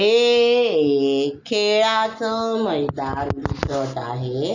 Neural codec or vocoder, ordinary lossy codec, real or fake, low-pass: none; Opus, 64 kbps; real; 7.2 kHz